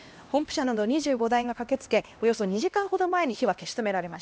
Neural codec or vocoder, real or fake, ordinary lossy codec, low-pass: codec, 16 kHz, 1 kbps, X-Codec, HuBERT features, trained on LibriSpeech; fake; none; none